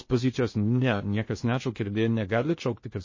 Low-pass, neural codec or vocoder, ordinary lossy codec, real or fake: 7.2 kHz; codec, 16 kHz in and 24 kHz out, 0.6 kbps, FocalCodec, streaming, 2048 codes; MP3, 32 kbps; fake